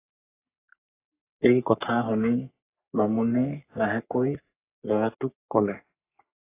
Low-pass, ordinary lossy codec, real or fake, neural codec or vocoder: 3.6 kHz; AAC, 24 kbps; fake; codec, 44.1 kHz, 3.4 kbps, Pupu-Codec